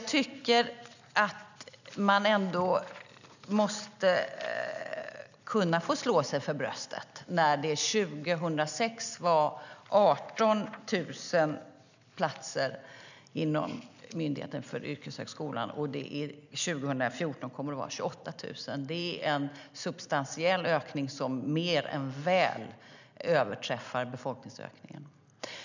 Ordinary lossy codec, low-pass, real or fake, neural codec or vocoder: none; 7.2 kHz; real; none